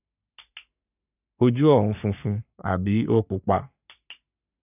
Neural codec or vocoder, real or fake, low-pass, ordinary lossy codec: codec, 44.1 kHz, 3.4 kbps, Pupu-Codec; fake; 3.6 kHz; none